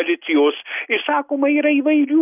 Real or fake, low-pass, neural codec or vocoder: real; 3.6 kHz; none